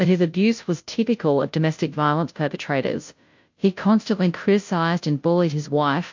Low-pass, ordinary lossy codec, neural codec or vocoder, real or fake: 7.2 kHz; MP3, 48 kbps; codec, 16 kHz, 0.5 kbps, FunCodec, trained on Chinese and English, 25 frames a second; fake